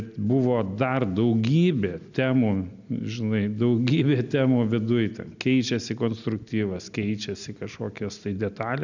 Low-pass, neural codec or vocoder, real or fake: 7.2 kHz; none; real